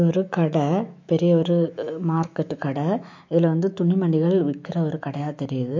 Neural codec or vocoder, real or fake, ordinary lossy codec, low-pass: autoencoder, 48 kHz, 128 numbers a frame, DAC-VAE, trained on Japanese speech; fake; MP3, 48 kbps; 7.2 kHz